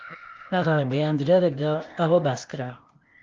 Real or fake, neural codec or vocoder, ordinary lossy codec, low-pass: fake; codec, 16 kHz, 0.8 kbps, ZipCodec; Opus, 24 kbps; 7.2 kHz